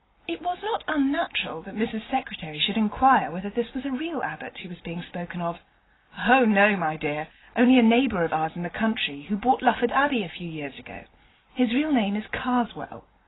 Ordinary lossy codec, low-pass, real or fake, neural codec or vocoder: AAC, 16 kbps; 7.2 kHz; real; none